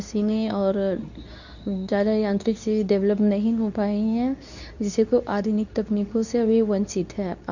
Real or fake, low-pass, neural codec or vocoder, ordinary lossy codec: fake; 7.2 kHz; codec, 24 kHz, 0.9 kbps, WavTokenizer, medium speech release version 2; none